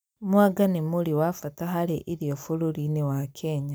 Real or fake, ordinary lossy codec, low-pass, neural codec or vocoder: real; none; none; none